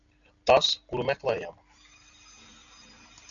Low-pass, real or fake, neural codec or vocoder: 7.2 kHz; real; none